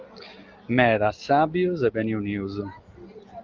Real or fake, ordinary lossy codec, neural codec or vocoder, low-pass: real; Opus, 32 kbps; none; 7.2 kHz